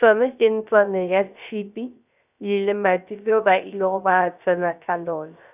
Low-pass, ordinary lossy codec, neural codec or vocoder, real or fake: 3.6 kHz; none; codec, 16 kHz, 0.3 kbps, FocalCodec; fake